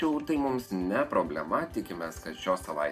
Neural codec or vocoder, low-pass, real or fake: none; 14.4 kHz; real